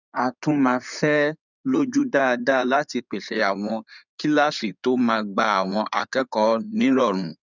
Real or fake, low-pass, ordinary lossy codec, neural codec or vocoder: fake; 7.2 kHz; none; codec, 16 kHz in and 24 kHz out, 2.2 kbps, FireRedTTS-2 codec